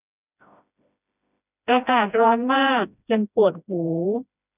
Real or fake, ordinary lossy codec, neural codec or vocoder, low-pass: fake; none; codec, 16 kHz, 1 kbps, FreqCodec, smaller model; 3.6 kHz